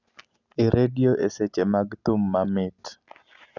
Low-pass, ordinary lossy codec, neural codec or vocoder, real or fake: 7.2 kHz; none; none; real